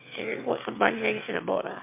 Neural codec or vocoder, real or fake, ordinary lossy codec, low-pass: autoencoder, 22.05 kHz, a latent of 192 numbers a frame, VITS, trained on one speaker; fake; MP3, 32 kbps; 3.6 kHz